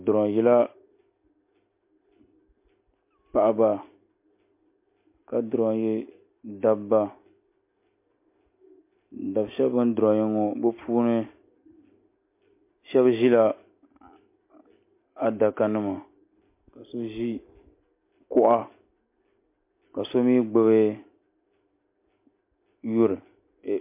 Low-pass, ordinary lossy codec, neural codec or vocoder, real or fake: 3.6 kHz; MP3, 24 kbps; none; real